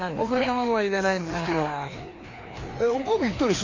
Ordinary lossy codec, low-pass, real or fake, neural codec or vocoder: AAC, 32 kbps; 7.2 kHz; fake; codec, 16 kHz, 2 kbps, FreqCodec, larger model